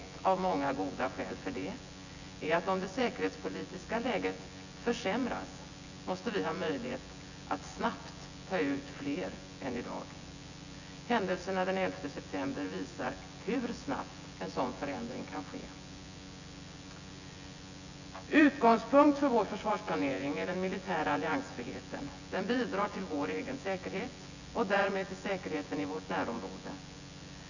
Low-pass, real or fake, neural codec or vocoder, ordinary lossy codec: 7.2 kHz; fake; vocoder, 24 kHz, 100 mel bands, Vocos; none